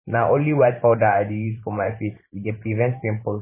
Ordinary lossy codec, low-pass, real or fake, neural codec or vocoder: MP3, 16 kbps; 3.6 kHz; fake; vocoder, 44.1 kHz, 128 mel bands every 512 samples, BigVGAN v2